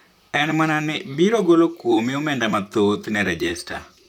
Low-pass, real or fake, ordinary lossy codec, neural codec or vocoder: 19.8 kHz; fake; none; vocoder, 44.1 kHz, 128 mel bands, Pupu-Vocoder